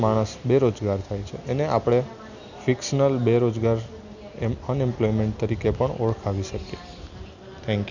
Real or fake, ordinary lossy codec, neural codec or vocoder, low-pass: real; none; none; 7.2 kHz